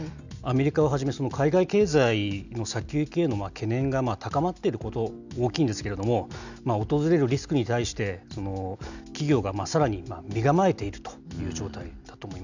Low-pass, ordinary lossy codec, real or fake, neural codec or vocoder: 7.2 kHz; none; real; none